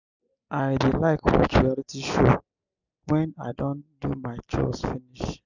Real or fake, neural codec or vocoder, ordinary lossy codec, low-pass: real; none; none; 7.2 kHz